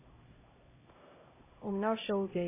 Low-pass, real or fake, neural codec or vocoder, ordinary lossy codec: 3.6 kHz; fake; codec, 16 kHz, 0.5 kbps, X-Codec, HuBERT features, trained on LibriSpeech; MP3, 16 kbps